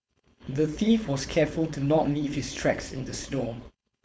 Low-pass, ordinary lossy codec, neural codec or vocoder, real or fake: none; none; codec, 16 kHz, 4.8 kbps, FACodec; fake